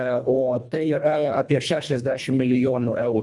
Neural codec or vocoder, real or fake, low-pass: codec, 24 kHz, 1.5 kbps, HILCodec; fake; 10.8 kHz